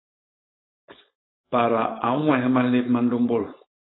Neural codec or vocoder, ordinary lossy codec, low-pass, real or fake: codec, 16 kHz, 4.8 kbps, FACodec; AAC, 16 kbps; 7.2 kHz; fake